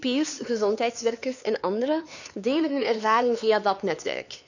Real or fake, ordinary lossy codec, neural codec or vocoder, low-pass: fake; none; codec, 16 kHz, 4 kbps, X-Codec, HuBERT features, trained on LibriSpeech; 7.2 kHz